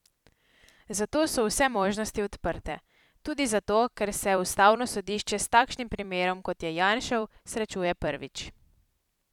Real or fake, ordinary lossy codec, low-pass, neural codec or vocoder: fake; none; 19.8 kHz; vocoder, 44.1 kHz, 128 mel bands every 256 samples, BigVGAN v2